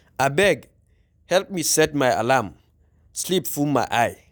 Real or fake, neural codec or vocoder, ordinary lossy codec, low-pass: real; none; none; none